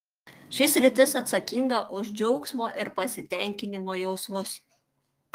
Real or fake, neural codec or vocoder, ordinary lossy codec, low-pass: fake; codec, 32 kHz, 1.9 kbps, SNAC; Opus, 32 kbps; 14.4 kHz